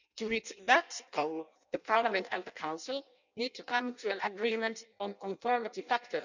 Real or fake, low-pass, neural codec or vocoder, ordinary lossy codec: fake; 7.2 kHz; codec, 16 kHz in and 24 kHz out, 0.6 kbps, FireRedTTS-2 codec; none